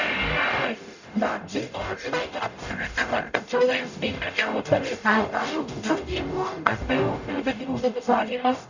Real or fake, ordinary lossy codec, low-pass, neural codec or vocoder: fake; none; 7.2 kHz; codec, 44.1 kHz, 0.9 kbps, DAC